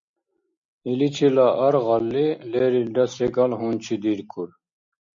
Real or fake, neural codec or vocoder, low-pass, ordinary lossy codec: real; none; 7.2 kHz; MP3, 48 kbps